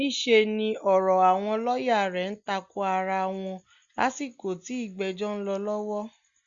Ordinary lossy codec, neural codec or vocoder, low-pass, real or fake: Opus, 64 kbps; none; 7.2 kHz; real